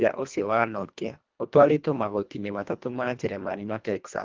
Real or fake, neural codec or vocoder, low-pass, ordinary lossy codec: fake; codec, 24 kHz, 1.5 kbps, HILCodec; 7.2 kHz; Opus, 32 kbps